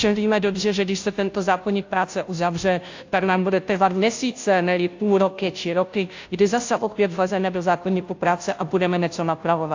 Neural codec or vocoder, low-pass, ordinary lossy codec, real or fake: codec, 16 kHz, 0.5 kbps, FunCodec, trained on Chinese and English, 25 frames a second; 7.2 kHz; AAC, 48 kbps; fake